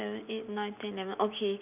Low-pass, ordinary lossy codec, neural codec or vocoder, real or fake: 3.6 kHz; none; none; real